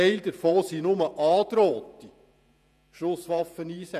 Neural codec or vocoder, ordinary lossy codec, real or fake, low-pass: vocoder, 48 kHz, 128 mel bands, Vocos; none; fake; 14.4 kHz